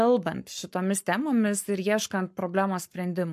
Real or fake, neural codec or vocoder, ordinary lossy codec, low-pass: fake; codec, 44.1 kHz, 7.8 kbps, Pupu-Codec; MP3, 64 kbps; 14.4 kHz